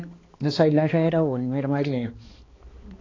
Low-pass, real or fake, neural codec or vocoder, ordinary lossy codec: 7.2 kHz; fake; codec, 16 kHz, 2 kbps, X-Codec, HuBERT features, trained on balanced general audio; AAC, 32 kbps